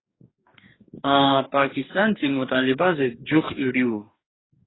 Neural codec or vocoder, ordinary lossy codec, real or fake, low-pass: codec, 44.1 kHz, 2.6 kbps, DAC; AAC, 16 kbps; fake; 7.2 kHz